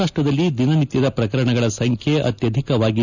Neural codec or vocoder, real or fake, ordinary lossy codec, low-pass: none; real; none; 7.2 kHz